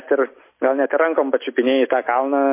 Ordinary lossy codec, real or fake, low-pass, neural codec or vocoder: MP3, 24 kbps; real; 3.6 kHz; none